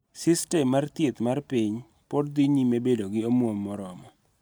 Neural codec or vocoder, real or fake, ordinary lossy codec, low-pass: none; real; none; none